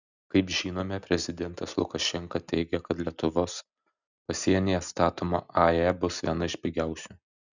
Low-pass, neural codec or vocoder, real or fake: 7.2 kHz; none; real